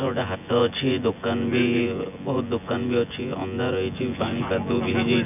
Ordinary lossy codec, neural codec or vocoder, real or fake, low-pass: none; vocoder, 24 kHz, 100 mel bands, Vocos; fake; 3.6 kHz